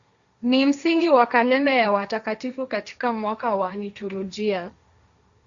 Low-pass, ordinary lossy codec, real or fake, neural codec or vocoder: 7.2 kHz; Opus, 64 kbps; fake; codec, 16 kHz, 1.1 kbps, Voila-Tokenizer